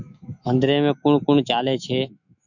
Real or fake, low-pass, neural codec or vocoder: fake; 7.2 kHz; autoencoder, 48 kHz, 128 numbers a frame, DAC-VAE, trained on Japanese speech